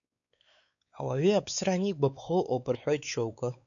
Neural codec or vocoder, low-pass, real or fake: codec, 16 kHz, 4 kbps, X-Codec, WavLM features, trained on Multilingual LibriSpeech; 7.2 kHz; fake